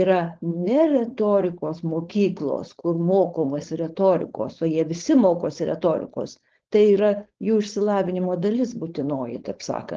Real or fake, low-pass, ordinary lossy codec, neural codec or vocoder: fake; 7.2 kHz; Opus, 16 kbps; codec, 16 kHz, 4.8 kbps, FACodec